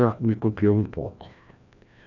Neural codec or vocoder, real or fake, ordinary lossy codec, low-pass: codec, 16 kHz, 1 kbps, FreqCodec, larger model; fake; none; 7.2 kHz